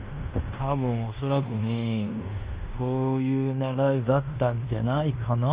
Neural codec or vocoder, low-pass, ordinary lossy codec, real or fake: codec, 16 kHz in and 24 kHz out, 0.9 kbps, LongCat-Audio-Codec, fine tuned four codebook decoder; 3.6 kHz; Opus, 32 kbps; fake